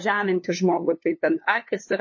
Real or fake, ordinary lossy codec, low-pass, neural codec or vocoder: fake; MP3, 32 kbps; 7.2 kHz; codec, 16 kHz, 2 kbps, FunCodec, trained on LibriTTS, 25 frames a second